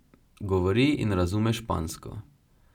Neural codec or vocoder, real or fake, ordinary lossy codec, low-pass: none; real; none; 19.8 kHz